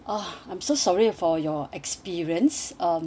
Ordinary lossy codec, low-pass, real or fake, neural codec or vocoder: none; none; real; none